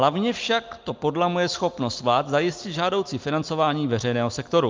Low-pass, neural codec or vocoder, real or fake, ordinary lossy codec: 7.2 kHz; none; real; Opus, 32 kbps